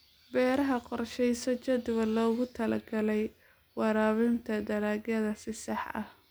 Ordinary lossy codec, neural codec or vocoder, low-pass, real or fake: none; none; none; real